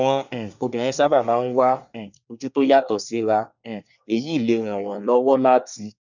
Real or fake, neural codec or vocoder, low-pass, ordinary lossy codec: fake; codec, 24 kHz, 1 kbps, SNAC; 7.2 kHz; none